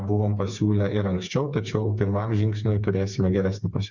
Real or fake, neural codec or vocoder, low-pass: fake; codec, 16 kHz, 4 kbps, FreqCodec, smaller model; 7.2 kHz